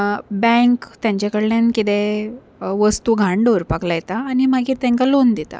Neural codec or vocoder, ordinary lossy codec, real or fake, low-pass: none; none; real; none